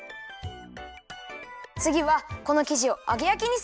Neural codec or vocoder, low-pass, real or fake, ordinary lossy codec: none; none; real; none